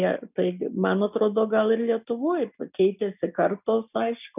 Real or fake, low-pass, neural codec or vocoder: real; 3.6 kHz; none